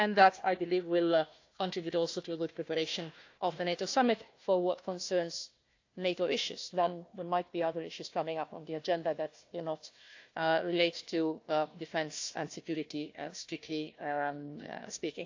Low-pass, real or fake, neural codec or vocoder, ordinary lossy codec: 7.2 kHz; fake; codec, 16 kHz, 1 kbps, FunCodec, trained on Chinese and English, 50 frames a second; AAC, 48 kbps